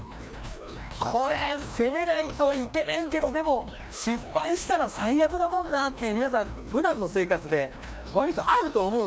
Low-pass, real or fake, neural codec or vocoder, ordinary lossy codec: none; fake; codec, 16 kHz, 1 kbps, FreqCodec, larger model; none